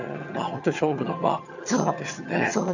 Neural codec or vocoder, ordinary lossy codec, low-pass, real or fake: vocoder, 22.05 kHz, 80 mel bands, HiFi-GAN; none; 7.2 kHz; fake